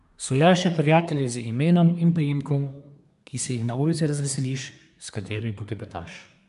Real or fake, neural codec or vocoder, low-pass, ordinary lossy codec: fake; codec, 24 kHz, 1 kbps, SNAC; 10.8 kHz; none